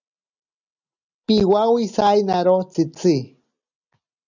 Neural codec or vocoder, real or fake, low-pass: none; real; 7.2 kHz